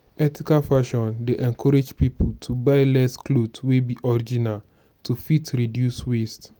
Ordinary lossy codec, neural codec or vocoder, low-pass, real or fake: none; none; none; real